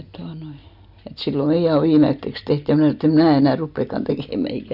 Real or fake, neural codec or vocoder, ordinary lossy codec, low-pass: real; none; none; 5.4 kHz